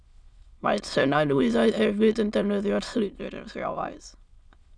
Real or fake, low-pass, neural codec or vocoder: fake; 9.9 kHz; autoencoder, 22.05 kHz, a latent of 192 numbers a frame, VITS, trained on many speakers